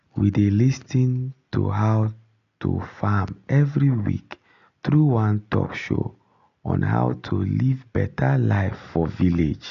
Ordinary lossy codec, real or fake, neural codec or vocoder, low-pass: none; real; none; 7.2 kHz